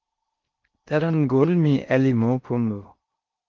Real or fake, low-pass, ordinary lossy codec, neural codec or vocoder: fake; 7.2 kHz; Opus, 32 kbps; codec, 16 kHz in and 24 kHz out, 0.6 kbps, FocalCodec, streaming, 4096 codes